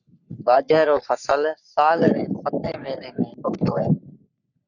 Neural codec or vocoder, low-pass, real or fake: codec, 44.1 kHz, 3.4 kbps, Pupu-Codec; 7.2 kHz; fake